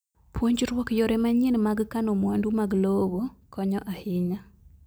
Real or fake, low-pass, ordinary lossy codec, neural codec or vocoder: real; none; none; none